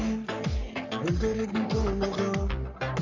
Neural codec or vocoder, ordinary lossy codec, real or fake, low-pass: codec, 44.1 kHz, 3.4 kbps, Pupu-Codec; none; fake; 7.2 kHz